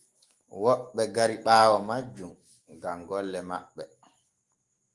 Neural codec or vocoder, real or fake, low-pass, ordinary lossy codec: autoencoder, 48 kHz, 128 numbers a frame, DAC-VAE, trained on Japanese speech; fake; 10.8 kHz; Opus, 24 kbps